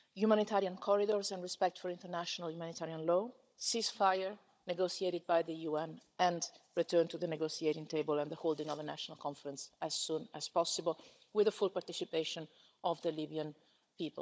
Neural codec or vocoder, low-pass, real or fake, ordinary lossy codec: codec, 16 kHz, 16 kbps, FunCodec, trained on Chinese and English, 50 frames a second; none; fake; none